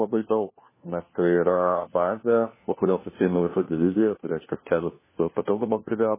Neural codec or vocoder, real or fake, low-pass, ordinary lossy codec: codec, 16 kHz, 1 kbps, FunCodec, trained on LibriTTS, 50 frames a second; fake; 3.6 kHz; MP3, 16 kbps